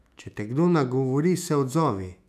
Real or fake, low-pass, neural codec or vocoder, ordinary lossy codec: fake; 14.4 kHz; autoencoder, 48 kHz, 128 numbers a frame, DAC-VAE, trained on Japanese speech; none